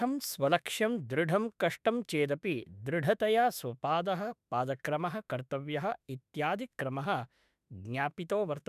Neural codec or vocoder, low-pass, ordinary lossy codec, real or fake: autoencoder, 48 kHz, 32 numbers a frame, DAC-VAE, trained on Japanese speech; 14.4 kHz; none; fake